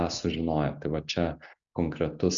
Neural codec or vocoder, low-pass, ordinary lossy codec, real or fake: none; 7.2 kHz; Opus, 64 kbps; real